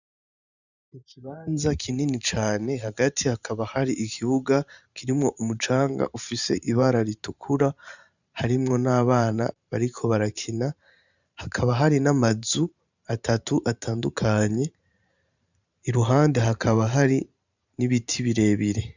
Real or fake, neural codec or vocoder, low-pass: fake; vocoder, 44.1 kHz, 128 mel bands every 512 samples, BigVGAN v2; 7.2 kHz